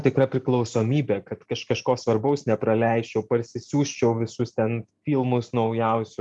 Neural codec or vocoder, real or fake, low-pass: none; real; 10.8 kHz